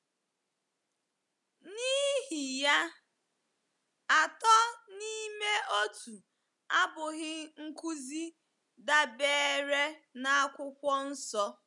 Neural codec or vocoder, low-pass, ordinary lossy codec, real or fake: none; 10.8 kHz; none; real